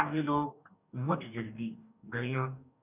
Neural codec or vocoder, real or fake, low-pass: codec, 44.1 kHz, 2.6 kbps, DAC; fake; 3.6 kHz